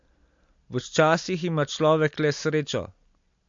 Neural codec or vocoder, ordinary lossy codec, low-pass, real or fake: none; MP3, 48 kbps; 7.2 kHz; real